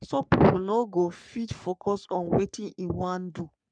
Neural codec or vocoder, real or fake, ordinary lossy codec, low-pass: codec, 44.1 kHz, 3.4 kbps, Pupu-Codec; fake; none; 9.9 kHz